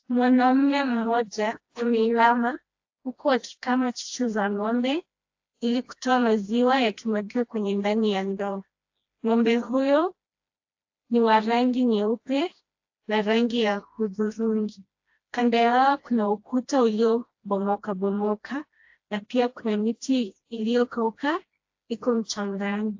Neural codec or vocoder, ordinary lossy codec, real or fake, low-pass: codec, 16 kHz, 1 kbps, FreqCodec, smaller model; AAC, 48 kbps; fake; 7.2 kHz